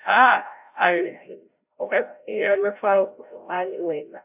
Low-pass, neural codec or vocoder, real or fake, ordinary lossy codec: 3.6 kHz; codec, 16 kHz, 0.5 kbps, FreqCodec, larger model; fake; AAC, 32 kbps